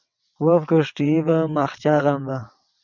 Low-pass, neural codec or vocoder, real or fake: 7.2 kHz; vocoder, 22.05 kHz, 80 mel bands, WaveNeXt; fake